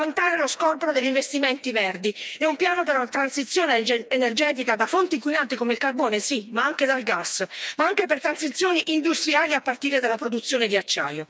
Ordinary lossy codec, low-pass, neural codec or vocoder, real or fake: none; none; codec, 16 kHz, 2 kbps, FreqCodec, smaller model; fake